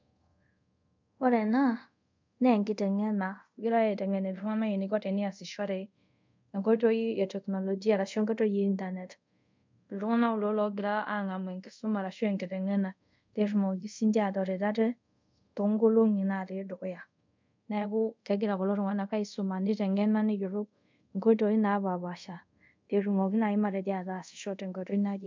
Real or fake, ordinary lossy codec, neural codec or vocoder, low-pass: fake; MP3, 64 kbps; codec, 24 kHz, 0.5 kbps, DualCodec; 7.2 kHz